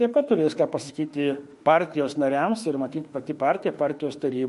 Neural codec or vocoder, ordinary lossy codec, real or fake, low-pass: autoencoder, 48 kHz, 32 numbers a frame, DAC-VAE, trained on Japanese speech; MP3, 48 kbps; fake; 14.4 kHz